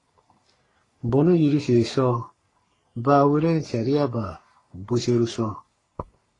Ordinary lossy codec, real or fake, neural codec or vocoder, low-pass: AAC, 32 kbps; fake; codec, 44.1 kHz, 3.4 kbps, Pupu-Codec; 10.8 kHz